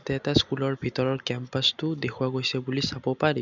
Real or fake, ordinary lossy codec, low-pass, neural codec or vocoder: real; none; 7.2 kHz; none